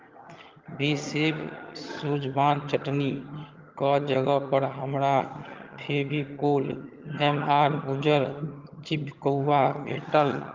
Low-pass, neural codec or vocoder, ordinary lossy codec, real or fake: 7.2 kHz; vocoder, 22.05 kHz, 80 mel bands, HiFi-GAN; Opus, 32 kbps; fake